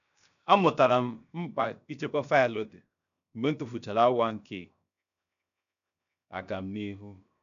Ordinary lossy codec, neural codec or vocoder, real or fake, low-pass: none; codec, 16 kHz, 0.7 kbps, FocalCodec; fake; 7.2 kHz